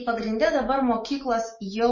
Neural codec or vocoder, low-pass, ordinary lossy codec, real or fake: autoencoder, 48 kHz, 128 numbers a frame, DAC-VAE, trained on Japanese speech; 7.2 kHz; MP3, 32 kbps; fake